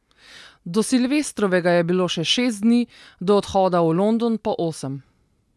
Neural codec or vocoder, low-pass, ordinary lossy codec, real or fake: none; none; none; real